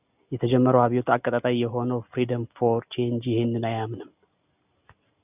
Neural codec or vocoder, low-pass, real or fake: none; 3.6 kHz; real